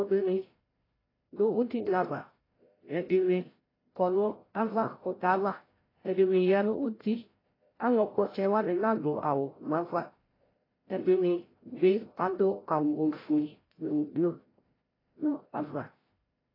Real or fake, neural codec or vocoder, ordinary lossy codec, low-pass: fake; codec, 16 kHz, 0.5 kbps, FreqCodec, larger model; AAC, 24 kbps; 5.4 kHz